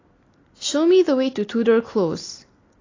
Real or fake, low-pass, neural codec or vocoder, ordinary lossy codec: real; 7.2 kHz; none; AAC, 32 kbps